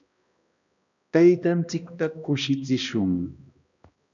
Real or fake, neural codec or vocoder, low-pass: fake; codec, 16 kHz, 1 kbps, X-Codec, HuBERT features, trained on balanced general audio; 7.2 kHz